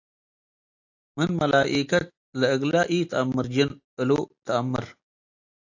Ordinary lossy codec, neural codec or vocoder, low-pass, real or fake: AAC, 48 kbps; none; 7.2 kHz; real